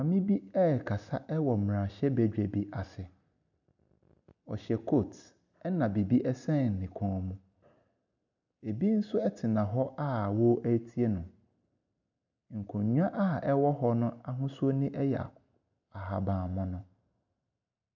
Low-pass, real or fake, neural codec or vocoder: 7.2 kHz; real; none